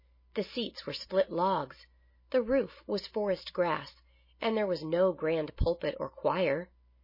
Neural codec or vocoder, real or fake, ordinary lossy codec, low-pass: none; real; MP3, 24 kbps; 5.4 kHz